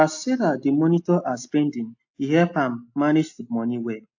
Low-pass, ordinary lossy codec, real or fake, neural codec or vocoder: 7.2 kHz; AAC, 48 kbps; real; none